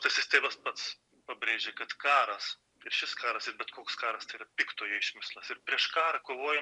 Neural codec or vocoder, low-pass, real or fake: none; 10.8 kHz; real